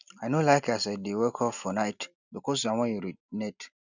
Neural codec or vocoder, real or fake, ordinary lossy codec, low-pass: none; real; none; 7.2 kHz